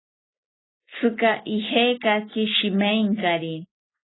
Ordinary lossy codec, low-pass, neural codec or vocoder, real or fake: AAC, 16 kbps; 7.2 kHz; none; real